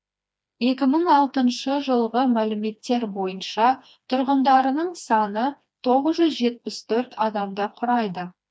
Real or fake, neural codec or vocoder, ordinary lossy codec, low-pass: fake; codec, 16 kHz, 2 kbps, FreqCodec, smaller model; none; none